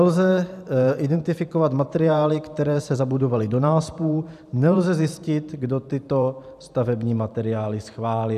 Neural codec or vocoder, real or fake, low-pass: vocoder, 44.1 kHz, 128 mel bands every 512 samples, BigVGAN v2; fake; 14.4 kHz